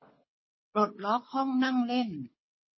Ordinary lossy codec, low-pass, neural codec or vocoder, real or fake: MP3, 24 kbps; 7.2 kHz; codec, 24 kHz, 6 kbps, HILCodec; fake